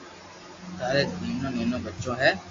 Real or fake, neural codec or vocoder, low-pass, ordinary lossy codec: real; none; 7.2 kHz; AAC, 48 kbps